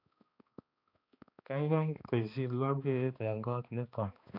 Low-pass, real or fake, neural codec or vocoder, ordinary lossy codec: 5.4 kHz; fake; codec, 16 kHz, 2 kbps, X-Codec, HuBERT features, trained on balanced general audio; AAC, 48 kbps